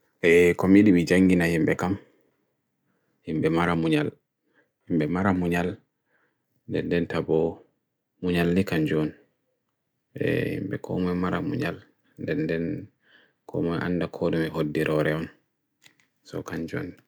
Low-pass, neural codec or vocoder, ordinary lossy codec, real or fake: none; none; none; real